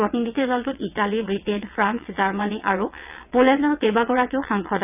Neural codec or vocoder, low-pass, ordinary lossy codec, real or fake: vocoder, 22.05 kHz, 80 mel bands, WaveNeXt; 3.6 kHz; none; fake